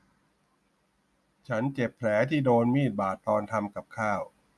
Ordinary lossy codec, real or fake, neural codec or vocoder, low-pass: none; real; none; none